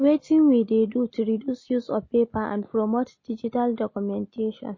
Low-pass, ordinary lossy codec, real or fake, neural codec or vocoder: 7.2 kHz; MP3, 32 kbps; real; none